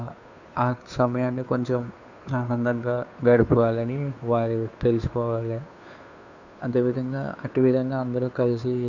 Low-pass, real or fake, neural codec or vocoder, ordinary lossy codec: 7.2 kHz; fake; codec, 16 kHz, 2 kbps, FunCodec, trained on Chinese and English, 25 frames a second; none